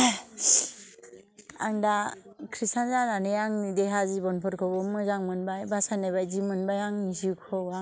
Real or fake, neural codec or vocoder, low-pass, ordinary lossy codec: real; none; none; none